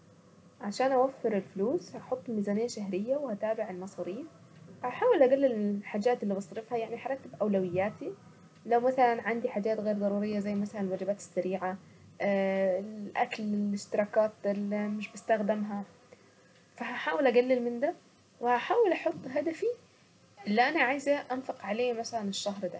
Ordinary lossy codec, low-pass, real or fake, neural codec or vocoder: none; none; real; none